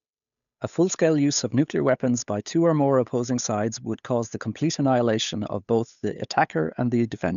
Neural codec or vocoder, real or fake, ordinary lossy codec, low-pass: codec, 16 kHz, 8 kbps, FunCodec, trained on Chinese and English, 25 frames a second; fake; none; 7.2 kHz